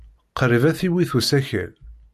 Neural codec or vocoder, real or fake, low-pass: none; real; 14.4 kHz